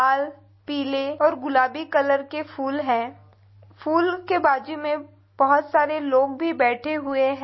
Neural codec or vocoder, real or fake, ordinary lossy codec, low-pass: none; real; MP3, 24 kbps; 7.2 kHz